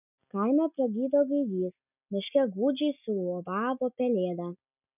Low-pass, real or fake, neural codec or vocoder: 3.6 kHz; real; none